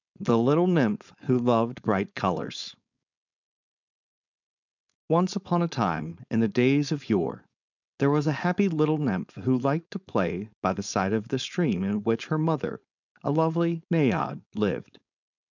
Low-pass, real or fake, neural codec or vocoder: 7.2 kHz; fake; codec, 16 kHz, 4.8 kbps, FACodec